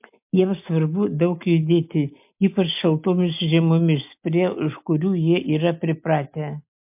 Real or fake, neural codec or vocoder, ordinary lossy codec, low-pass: real; none; MP3, 32 kbps; 3.6 kHz